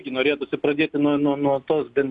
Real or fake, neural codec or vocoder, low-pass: real; none; 10.8 kHz